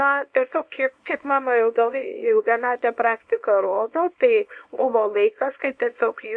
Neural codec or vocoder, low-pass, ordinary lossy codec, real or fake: codec, 24 kHz, 0.9 kbps, WavTokenizer, small release; 9.9 kHz; MP3, 64 kbps; fake